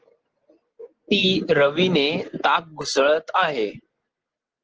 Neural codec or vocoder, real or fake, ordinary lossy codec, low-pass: none; real; Opus, 16 kbps; 7.2 kHz